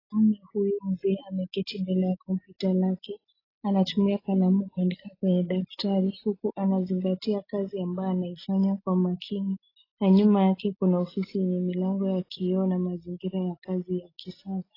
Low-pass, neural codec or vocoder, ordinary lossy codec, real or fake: 5.4 kHz; none; AAC, 24 kbps; real